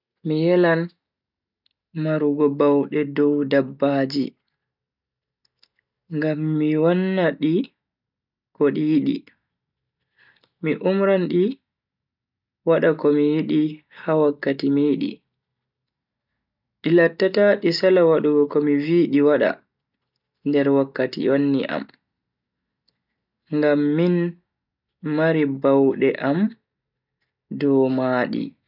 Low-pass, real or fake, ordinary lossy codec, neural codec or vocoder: 5.4 kHz; real; none; none